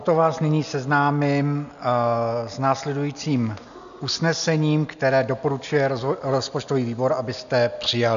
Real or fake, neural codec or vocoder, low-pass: real; none; 7.2 kHz